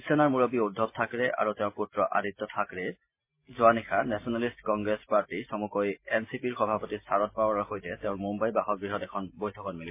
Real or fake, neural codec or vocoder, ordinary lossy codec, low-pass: real; none; MP3, 24 kbps; 3.6 kHz